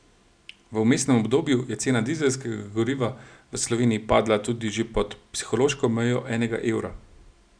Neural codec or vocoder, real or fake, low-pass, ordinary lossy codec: none; real; 9.9 kHz; none